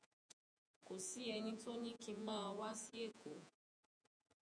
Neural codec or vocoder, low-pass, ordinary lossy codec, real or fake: vocoder, 48 kHz, 128 mel bands, Vocos; 9.9 kHz; MP3, 96 kbps; fake